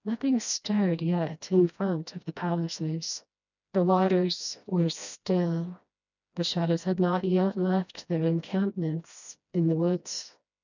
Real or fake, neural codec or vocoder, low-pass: fake; codec, 16 kHz, 1 kbps, FreqCodec, smaller model; 7.2 kHz